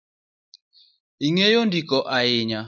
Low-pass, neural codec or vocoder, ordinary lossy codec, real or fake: 7.2 kHz; none; MP3, 64 kbps; real